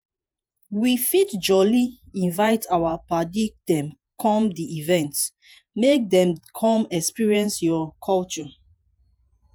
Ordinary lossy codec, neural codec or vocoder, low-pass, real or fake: none; vocoder, 48 kHz, 128 mel bands, Vocos; none; fake